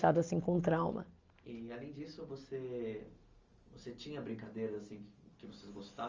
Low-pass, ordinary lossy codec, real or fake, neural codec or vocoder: 7.2 kHz; Opus, 32 kbps; real; none